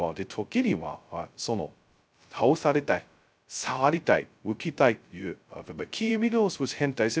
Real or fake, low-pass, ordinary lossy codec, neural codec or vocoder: fake; none; none; codec, 16 kHz, 0.2 kbps, FocalCodec